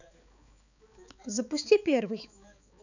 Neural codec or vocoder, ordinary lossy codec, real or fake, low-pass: codec, 16 kHz, 4 kbps, X-Codec, HuBERT features, trained on balanced general audio; none; fake; 7.2 kHz